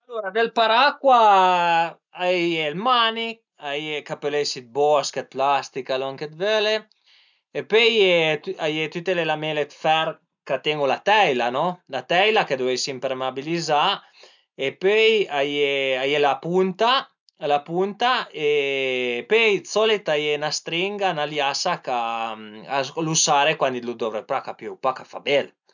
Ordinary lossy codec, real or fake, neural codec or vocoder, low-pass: none; real; none; 7.2 kHz